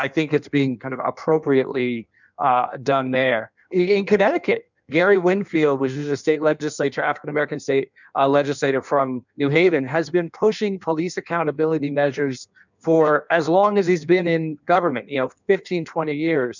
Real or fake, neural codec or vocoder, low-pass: fake; codec, 16 kHz in and 24 kHz out, 1.1 kbps, FireRedTTS-2 codec; 7.2 kHz